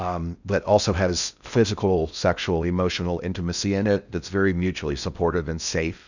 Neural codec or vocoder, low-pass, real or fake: codec, 16 kHz in and 24 kHz out, 0.6 kbps, FocalCodec, streaming, 4096 codes; 7.2 kHz; fake